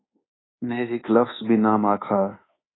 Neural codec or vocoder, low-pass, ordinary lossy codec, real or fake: codec, 24 kHz, 1.2 kbps, DualCodec; 7.2 kHz; AAC, 16 kbps; fake